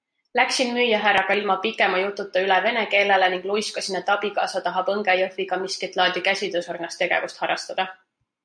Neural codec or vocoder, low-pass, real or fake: none; 9.9 kHz; real